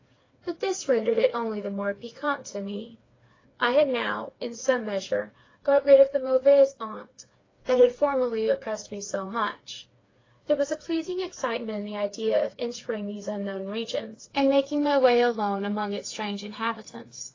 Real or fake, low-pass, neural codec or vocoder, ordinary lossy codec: fake; 7.2 kHz; codec, 16 kHz, 4 kbps, FreqCodec, smaller model; AAC, 32 kbps